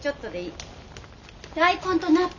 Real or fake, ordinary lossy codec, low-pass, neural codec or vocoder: real; none; 7.2 kHz; none